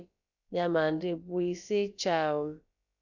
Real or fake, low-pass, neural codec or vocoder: fake; 7.2 kHz; codec, 16 kHz, about 1 kbps, DyCAST, with the encoder's durations